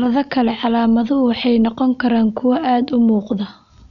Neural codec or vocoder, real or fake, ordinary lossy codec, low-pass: none; real; none; 7.2 kHz